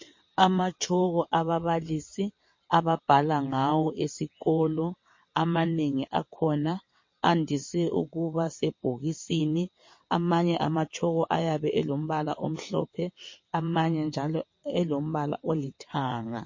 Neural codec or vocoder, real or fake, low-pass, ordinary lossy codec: vocoder, 22.05 kHz, 80 mel bands, WaveNeXt; fake; 7.2 kHz; MP3, 32 kbps